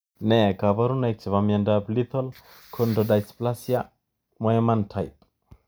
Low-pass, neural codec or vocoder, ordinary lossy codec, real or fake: none; none; none; real